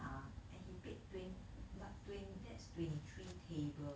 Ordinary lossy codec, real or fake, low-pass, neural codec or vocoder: none; real; none; none